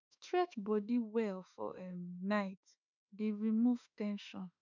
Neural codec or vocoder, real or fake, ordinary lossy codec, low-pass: autoencoder, 48 kHz, 32 numbers a frame, DAC-VAE, trained on Japanese speech; fake; none; 7.2 kHz